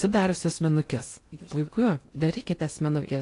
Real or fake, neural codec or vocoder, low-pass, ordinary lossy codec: fake; codec, 16 kHz in and 24 kHz out, 0.6 kbps, FocalCodec, streaming, 2048 codes; 10.8 kHz; AAC, 48 kbps